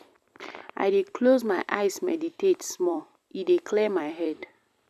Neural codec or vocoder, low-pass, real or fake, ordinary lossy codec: vocoder, 44.1 kHz, 128 mel bands every 256 samples, BigVGAN v2; 14.4 kHz; fake; none